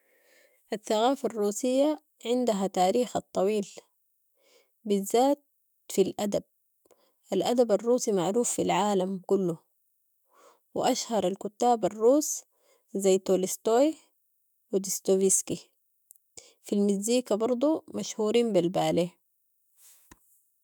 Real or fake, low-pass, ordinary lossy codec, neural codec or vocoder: fake; none; none; autoencoder, 48 kHz, 128 numbers a frame, DAC-VAE, trained on Japanese speech